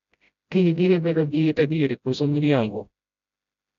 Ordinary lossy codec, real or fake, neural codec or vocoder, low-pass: none; fake; codec, 16 kHz, 0.5 kbps, FreqCodec, smaller model; 7.2 kHz